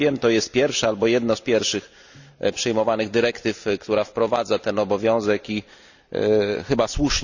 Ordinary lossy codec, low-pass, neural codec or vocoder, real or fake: none; 7.2 kHz; none; real